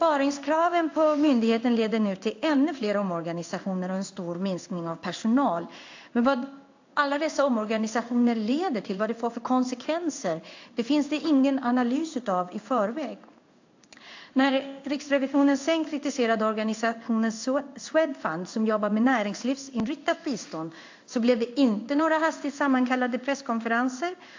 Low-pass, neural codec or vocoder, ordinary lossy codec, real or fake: 7.2 kHz; codec, 16 kHz in and 24 kHz out, 1 kbps, XY-Tokenizer; AAC, 48 kbps; fake